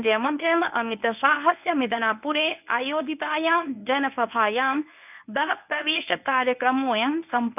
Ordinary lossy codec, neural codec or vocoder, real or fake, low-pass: none; codec, 24 kHz, 0.9 kbps, WavTokenizer, medium speech release version 1; fake; 3.6 kHz